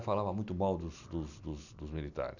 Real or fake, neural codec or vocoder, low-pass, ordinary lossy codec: real; none; 7.2 kHz; none